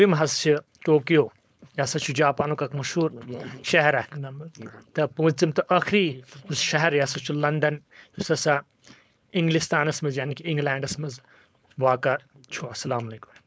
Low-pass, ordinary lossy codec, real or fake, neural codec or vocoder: none; none; fake; codec, 16 kHz, 4.8 kbps, FACodec